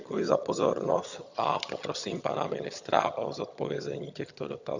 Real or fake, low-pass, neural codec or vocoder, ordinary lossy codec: fake; 7.2 kHz; vocoder, 22.05 kHz, 80 mel bands, HiFi-GAN; Opus, 64 kbps